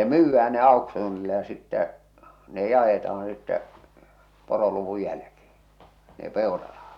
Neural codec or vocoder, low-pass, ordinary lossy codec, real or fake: none; 19.8 kHz; none; real